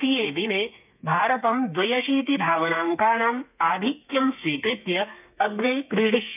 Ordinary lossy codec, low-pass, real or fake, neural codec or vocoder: none; 3.6 kHz; fake; codec, 32 kHz, 1.9 kbps, SNAC